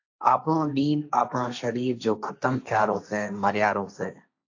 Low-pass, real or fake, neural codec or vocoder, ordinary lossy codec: 7.2 kHz; fake; codec, 16 kHz, 1.1 kbps, Voila-Tokenizer; AAC, 48 kbps